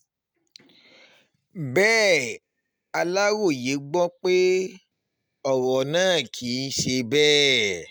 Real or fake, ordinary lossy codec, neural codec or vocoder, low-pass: real; none; none; none